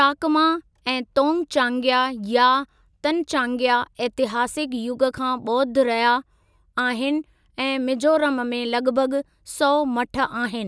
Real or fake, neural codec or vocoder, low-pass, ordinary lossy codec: real; none; none; none